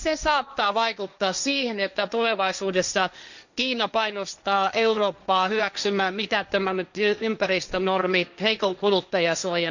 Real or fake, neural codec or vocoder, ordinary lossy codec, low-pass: fake; codec, 16 kHz, 1.1 kbps, Voila-Tokenizer; none; 7.2 kHz